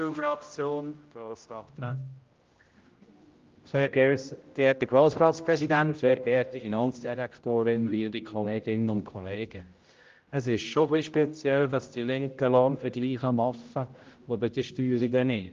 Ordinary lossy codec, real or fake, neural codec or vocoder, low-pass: Opus, 32 kbps; fake; codec, 16 kHz, 0.5 kbps, X-Codec, HuBERT features, trained on general audio; 7.2 kHz